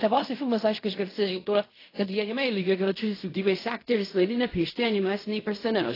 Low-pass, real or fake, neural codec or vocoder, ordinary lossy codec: 5.4 kHz; fake; codec, 16 kHz in and 24 kHz out, 0.4 kbps, LongCat-Audio-Codec, fine tuned four codebook decoder; AAC, 24 kbps